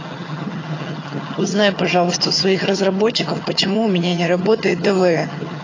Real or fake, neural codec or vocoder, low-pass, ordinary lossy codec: fake; vocoder, 22.05 kHz, 80 mel bands, HiFi-GAN; 7.2 kHz; AAC, 32 kbps